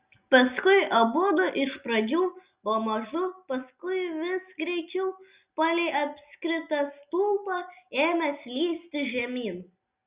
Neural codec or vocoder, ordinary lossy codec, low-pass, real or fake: none; Opus, 64 kbps; 3.6 kHz; real